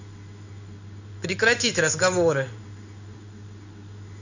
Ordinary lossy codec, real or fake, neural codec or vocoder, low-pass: none; fake; codec, 16 kHz in and 24 kHz out, 1 kbps, XY-Tokenizer; 7.2 kHz